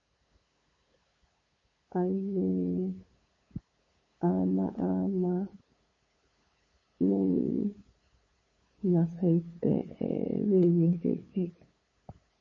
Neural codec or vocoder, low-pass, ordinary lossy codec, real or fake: codec, 16 kHz, 8 kbps, FunCodec, trained on LibriTTS, 25 frames a second; 7.2 kHz; MP3, 32 kbps; fake